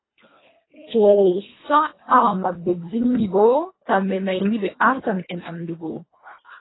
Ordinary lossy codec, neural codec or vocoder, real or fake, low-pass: AAC, 16 kbps; codec, 24 kHz, 1.5 kbps, HILCodec; fake; 7.2 kHz